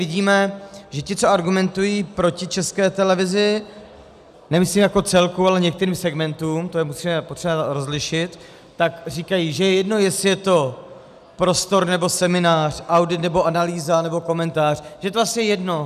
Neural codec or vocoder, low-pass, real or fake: none; 14.4 kHz; real